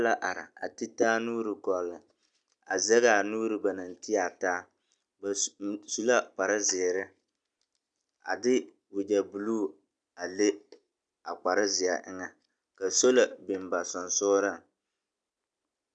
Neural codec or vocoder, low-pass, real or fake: none; 9.9 kHz; real